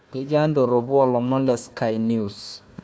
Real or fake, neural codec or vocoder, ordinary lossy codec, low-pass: fake; codec, 16 kHz, 1 kbps, FunCodec, trained on Chinese and English, 50 frames a second; none; none